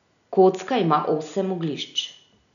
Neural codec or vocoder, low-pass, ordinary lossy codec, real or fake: none; 7.2 kHz; none; real